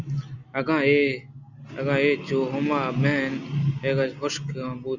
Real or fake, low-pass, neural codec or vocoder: real; 7.2 kHz; none